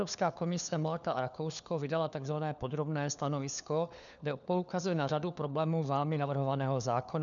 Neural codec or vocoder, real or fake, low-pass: codec, 16 kHz, 4 kbps, FunCodec, trained on LibriTTS, 50 frames a second; fake; 7.2 kHz